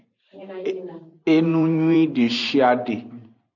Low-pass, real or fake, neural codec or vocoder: 7.2 kHz; fake; vocoder, 44.1 kHz, 128 mel bands every 512 samples, BigVGAN v2